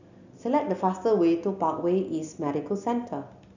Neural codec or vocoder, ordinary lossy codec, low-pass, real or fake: none; none; 7.2 kHz; real